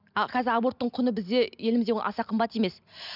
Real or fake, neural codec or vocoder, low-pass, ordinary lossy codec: real; none; 5.4 kHz; none